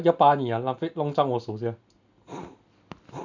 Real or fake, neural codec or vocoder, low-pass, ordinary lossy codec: real; none; 7.2 kHz; none